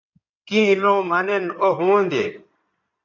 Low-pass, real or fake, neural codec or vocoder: 7.2 kHz; fake; codec, 16 kHz in and 24 kHz out, 2.2 kbps, FireRedTTS-2 codec